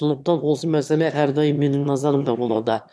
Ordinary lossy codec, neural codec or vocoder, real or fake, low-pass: none; autoencoder, 22.05 kHz, a latent of 192 numbers a frame, VITS, trained on one speaker; fake; none